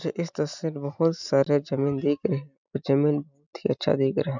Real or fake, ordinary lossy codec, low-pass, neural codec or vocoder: real; none; 7.2 kHz; none